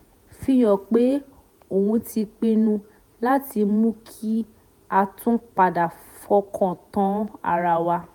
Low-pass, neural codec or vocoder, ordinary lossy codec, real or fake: 19.8 kHz; vocoder, 48 kHz, 128 mel bands, Vocos; none; fake